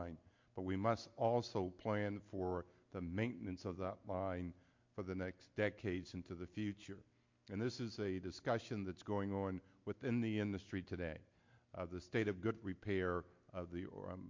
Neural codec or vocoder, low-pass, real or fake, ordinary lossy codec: none; 7.2 kHz; real; MP3, 48 kbps